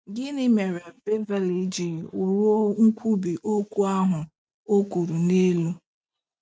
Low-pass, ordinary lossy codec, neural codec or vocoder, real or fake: none; none; none; real